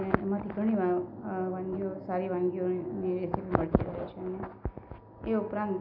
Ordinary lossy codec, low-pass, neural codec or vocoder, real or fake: none; 5.4 kHz; none; real